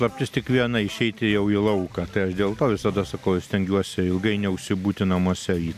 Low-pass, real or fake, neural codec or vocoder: 14.4 kHz; real; none